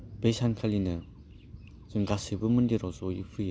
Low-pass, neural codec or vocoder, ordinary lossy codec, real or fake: none; none; none; real